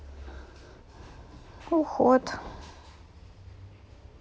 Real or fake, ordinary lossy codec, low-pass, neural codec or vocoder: real; none; none; none